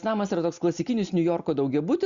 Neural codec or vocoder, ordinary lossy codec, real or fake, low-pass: none; Opus, 64 kbps; real; 7.2 kHz